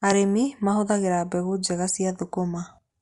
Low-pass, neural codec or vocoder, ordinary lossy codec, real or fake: 10.8 kHz; none; Opus, 64 kbps; real